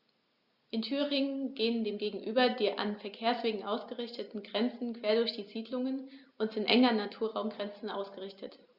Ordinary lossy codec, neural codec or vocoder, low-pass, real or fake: Opus, 64 kbps; none; 5.4 kHz; real